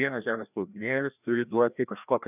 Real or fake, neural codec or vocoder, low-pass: fake; codec, 16 kHz, 1 kbps, FreqCodec, larger model; 3.6 kHz